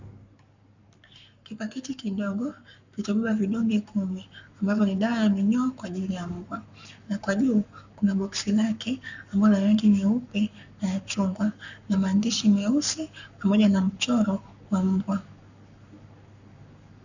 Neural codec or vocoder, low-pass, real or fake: codec, 44.1 kHz, 7.8 kbps, Pupu-Codec; 7.2 kHz; fake